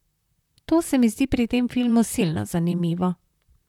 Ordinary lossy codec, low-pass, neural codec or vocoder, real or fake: none; 19.8 kHz; vocoder, 44.1 kHz, 128 mel bands, Pupu-Vocoder; fake